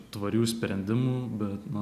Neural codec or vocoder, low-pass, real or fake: none; 14.4 kHz; real